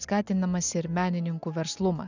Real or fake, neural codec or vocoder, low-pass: real; none; 7.2 kHz